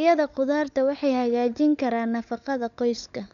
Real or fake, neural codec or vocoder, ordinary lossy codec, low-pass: fake; codec, 16 kHz, 16 kbps, FunCodec, trained on LibriTTS, 50 frames a second; none; 7.2 kHz